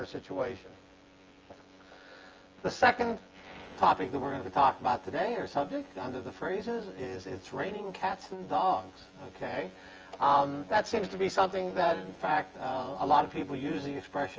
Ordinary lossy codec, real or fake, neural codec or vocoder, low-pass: Opus, 24 kbps; fake; vocoder, 24 kHz, 100 mel bands, Vocos; 7.2 kHz